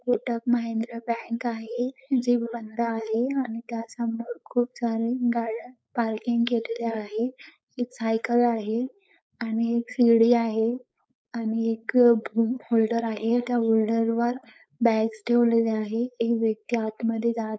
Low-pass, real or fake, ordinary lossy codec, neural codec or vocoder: none; fake; none; codec, 16 kHz, 4.8 kbps, FACodec